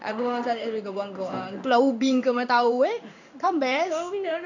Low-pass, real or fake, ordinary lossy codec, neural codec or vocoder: 7.2 kHz; fake; none; codec, 16 kHz in and 24 kHz out, 1 kbps, XY-Tokenizer